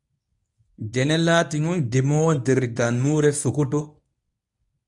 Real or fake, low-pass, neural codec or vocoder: fake; 10.8 kHz; codec, 24 kHz, 0.9 kbps, WavTokenizer, medium speech release version 1